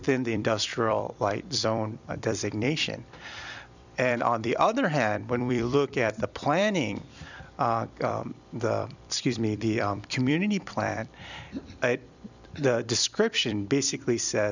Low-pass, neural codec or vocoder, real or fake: 7.2 kHz; vocoder, 44.1 kHz, 128 mel bands every 256 samples, BigVGAN v2; fake